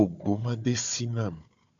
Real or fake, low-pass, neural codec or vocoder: fake; 7.2 kHz; codec, 16 kHz, 4 kbps, FunCodec, trained on Chinese and English, 50 frames a second